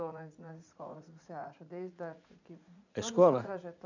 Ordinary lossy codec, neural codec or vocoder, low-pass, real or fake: none; vocoder, 44.1 kHz, 80 mel bands, Vocos; 7.2 kHz; fake